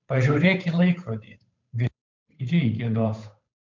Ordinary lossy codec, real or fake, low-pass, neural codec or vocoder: MP3, 64 kbps; fake; 7.2 kHz; codec, 16 kHz, 8 kbps, FunCodec, trained on Chinese and English, 25 frames a second